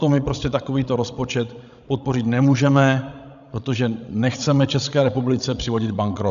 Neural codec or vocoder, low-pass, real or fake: codec, 16 kHz, 16 kbps, FreqCodec, larger model; 7.2 kHz; fake